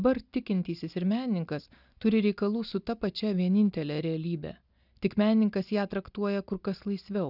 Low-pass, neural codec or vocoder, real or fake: 5.4 kHz; none; real